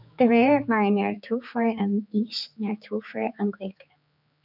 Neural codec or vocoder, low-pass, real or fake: codec, 16 kHz, 4 kbps, X-Codec, HuBERT features, trained on general audio; 5.4 kHz; fake